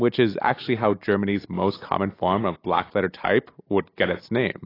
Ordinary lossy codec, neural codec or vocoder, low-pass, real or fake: AAC, 24 kbps; none; 5.4 kHz; real